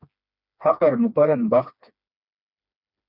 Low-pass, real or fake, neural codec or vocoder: 5.4 kHz; fake; codec, 16 kHz, 2 kbps, FreqCodec, smaller model